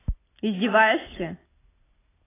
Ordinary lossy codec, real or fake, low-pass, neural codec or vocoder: AAC, 16 kbps; real; 3.6 kHz; none